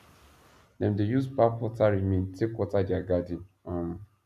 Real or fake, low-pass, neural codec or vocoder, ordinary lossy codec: real; 14.4 kHz; none; none